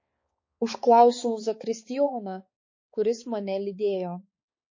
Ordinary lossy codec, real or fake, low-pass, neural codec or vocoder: MP3, 32 kbps; fake; 7.2 kHz; codec, 16 kHz, 4 kbps, X-Codec, HuBERT features, trained on balanced general audio